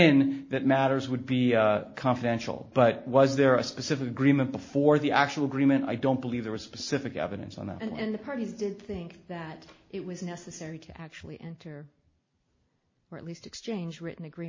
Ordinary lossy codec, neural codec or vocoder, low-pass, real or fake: MP3, 32 kbps; none; 7.2 kHz; real